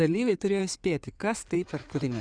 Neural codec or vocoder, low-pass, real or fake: codec, 16 kHz in and 24 kHz out, 2.2 kbps, FireRedTTS-2 codec; 9.9 kHz; fake